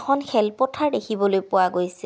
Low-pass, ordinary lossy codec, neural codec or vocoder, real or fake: none; none; none; real